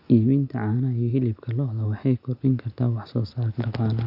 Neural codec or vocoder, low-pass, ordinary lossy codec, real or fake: none; 5.4 kHz; none; real